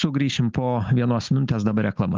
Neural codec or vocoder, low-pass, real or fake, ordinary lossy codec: none; 7.2 kHz; real; Opus, 24 kbps